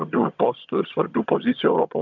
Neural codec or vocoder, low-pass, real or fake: vocoder, 22.05 kHz, 80 mel bands, HiFi-GAN; 7.2 kHz; fake